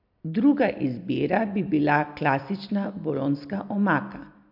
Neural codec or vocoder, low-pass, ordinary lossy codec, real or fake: none; 5.4 kHz; MP3, 48 kbps; real